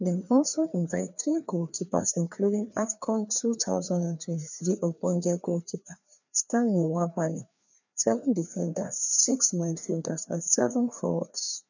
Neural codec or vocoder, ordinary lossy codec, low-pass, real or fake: codec, 16 kHz, 2 kbps, FreqCodec, larger model; none; 7.2 kHz; fake